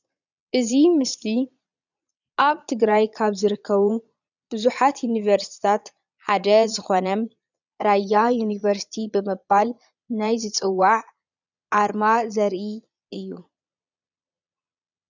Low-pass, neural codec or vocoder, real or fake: 7.2 kHz; vocoder, 22.05 kHz, 80 mel bands, Vocos; fake